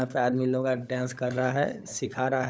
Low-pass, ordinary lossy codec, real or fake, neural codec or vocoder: none; none; fake; codec, 16 kHz, 16 kbps, FunCodec, trained on LibriTTS, 50 frames a second